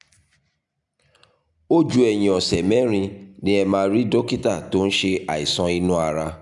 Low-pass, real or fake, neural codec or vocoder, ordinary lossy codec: 10.8 kHz; real; none; none